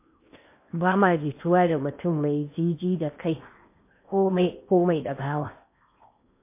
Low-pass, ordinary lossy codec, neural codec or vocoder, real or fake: 3.6 kHz; MP3, 24 kbps; codec, 16 kHz in and 24 kHz out, 0.8 kbps, FocalCodec, streaming, 65536 codes; fake